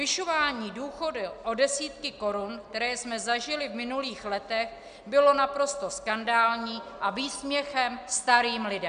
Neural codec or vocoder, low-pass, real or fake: none; 9.9 kHz; real